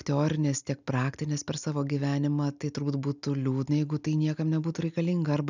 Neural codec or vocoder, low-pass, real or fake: none; 7.2 kHz; real